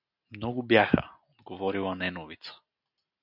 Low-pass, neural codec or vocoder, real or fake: 5.4 kHz; none; real